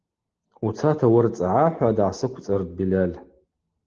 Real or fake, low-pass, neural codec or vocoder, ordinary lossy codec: real; 7.2 kHz; none; Opus, 16 kbps